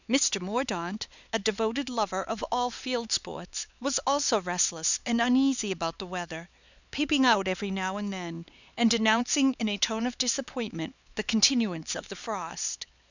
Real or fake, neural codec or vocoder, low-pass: fake; codec, 16 kHz, 4 kbps, X-Codec, HuBERT features, trained on LibriSpeech; 7.2 kHz